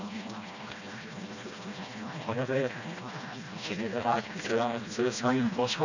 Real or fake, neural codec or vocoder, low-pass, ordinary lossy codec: fake; codec, 16 kHz, 1 kbps, FreqCodec, smaller model; 7.2 kHz; none